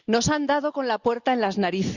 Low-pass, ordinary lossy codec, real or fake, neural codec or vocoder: 7.2 kHz; Opus, 64 kbps; real; none